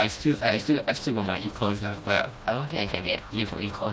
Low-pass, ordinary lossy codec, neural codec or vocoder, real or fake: none; none; codec, 16 kHz, 1 kbps, FreqCodec, smaller model; fake